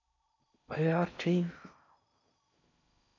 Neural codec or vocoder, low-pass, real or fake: codec, 16 kHz in and 24 kHz out, 0.6 kbps, FocalCodec, streaming, 4096 codes; 7.2 kHz; fake